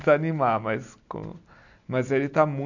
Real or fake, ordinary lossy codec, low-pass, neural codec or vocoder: real; none; 7.2 kHz; none